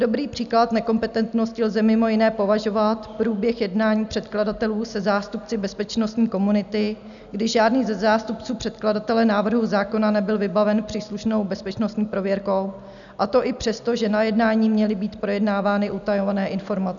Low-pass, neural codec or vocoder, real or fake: 7.2 kHz; none; real